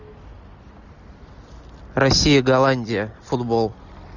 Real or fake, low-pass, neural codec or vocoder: real; 7.2 kHz; none